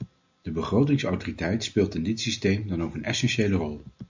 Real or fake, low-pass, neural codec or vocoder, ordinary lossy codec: real; 7.2 kHz; none; MP3, 64 kbps